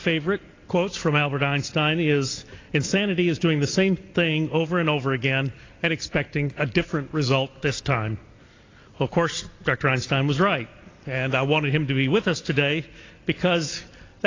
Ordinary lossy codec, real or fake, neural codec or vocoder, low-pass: AAC, 32 kbps; real; none; 7.2 kHz